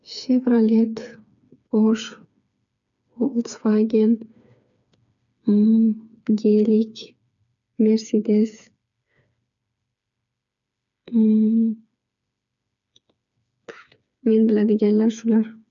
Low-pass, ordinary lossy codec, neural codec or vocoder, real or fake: 7.2 kHz; none; codec, 16 kHz, 4 kbps, FreqCodec, smaller model; fake